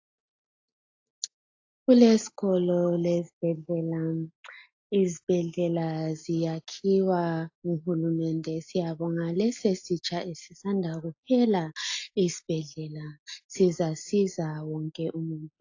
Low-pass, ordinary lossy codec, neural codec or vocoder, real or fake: 7.2 kHz; AAC, 48 kbps; none; real